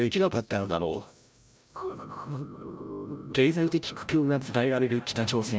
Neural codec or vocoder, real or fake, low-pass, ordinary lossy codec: codec, 16 kHz, 0.5 kbps, FreqCodec, larger model; fake; none; none